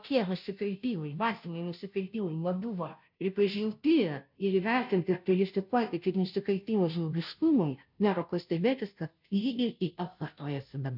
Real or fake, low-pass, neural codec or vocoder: fake; 5.4 kHz; codec, 16 kHz, 0.5 kbps, FunCodec, trained on Chinese and English, 25 frames a second